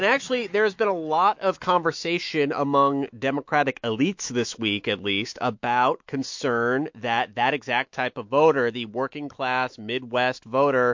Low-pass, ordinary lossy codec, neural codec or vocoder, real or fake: 7.2 kHz; MP3, 48 kbps; autoencoder, 48 kHz, 128 numbers a frame, DAC-VAE, trained on Japanese speech; fake